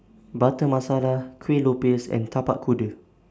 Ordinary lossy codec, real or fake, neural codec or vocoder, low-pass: none; real; none; none